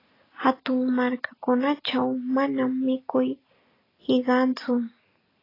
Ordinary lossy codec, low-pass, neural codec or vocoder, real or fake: AAC, 24 kbps; 5.4 kHz; none; real